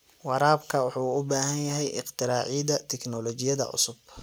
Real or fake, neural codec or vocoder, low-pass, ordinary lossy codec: real; none; none; none